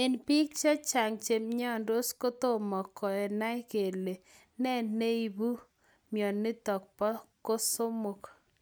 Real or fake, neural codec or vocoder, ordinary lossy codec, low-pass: real; none; none; none